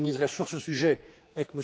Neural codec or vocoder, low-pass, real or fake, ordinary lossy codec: codec, 16 kHz, 2 kbps, X-Codec, HuBERT features, trained on general audio; none; fake; none